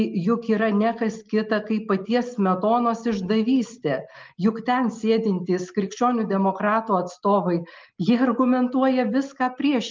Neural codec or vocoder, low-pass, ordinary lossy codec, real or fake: none; 7.2 kHz; Opus, 32 kbps; real